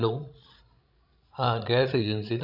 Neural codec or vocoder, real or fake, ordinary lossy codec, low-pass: codec, 16 kHz, 16 kbps, FreqCodec, larger model; fake; none; 5.4 kHz